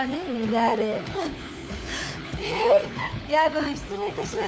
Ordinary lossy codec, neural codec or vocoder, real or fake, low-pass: none; codec, 16 kHz, 4 kbps, FunCodec, trained on LibriTTS, 50 frames a second; fake; none